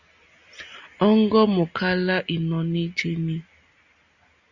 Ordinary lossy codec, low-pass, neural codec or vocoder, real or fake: Opus, 64 kbps; 7.2 kHz; none; real